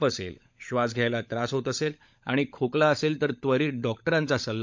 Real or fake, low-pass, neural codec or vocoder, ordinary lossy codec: fake; 7.2 kHz; codec, 16 kHz, 16 kbps, FunCodec, trained on LibriTTS, 50 frames a second; MP3, 64 kbps